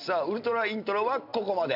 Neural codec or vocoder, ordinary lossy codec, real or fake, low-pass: none; AAC, 48 kbps; real; 5.4 kHz